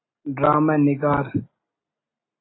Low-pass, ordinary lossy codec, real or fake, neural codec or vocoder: 7.2 kHz; AAC, 16 kbps; real; none